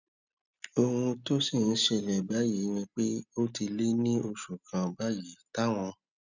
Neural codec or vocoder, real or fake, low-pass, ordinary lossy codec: none; real; 7.2 kHz; none